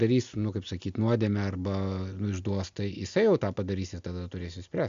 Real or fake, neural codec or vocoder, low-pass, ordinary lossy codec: real; none; 7.2 kHz; AAC, 64 kbps